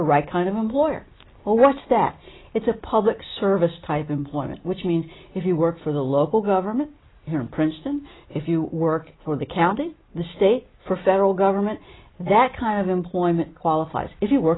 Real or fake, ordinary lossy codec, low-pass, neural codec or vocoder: real; AAC, 16 kbps; 7.2 kHz; none